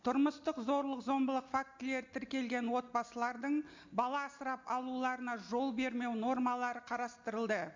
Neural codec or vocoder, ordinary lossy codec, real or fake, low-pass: none; MP3, 48 kbps; real; 7.2 kHz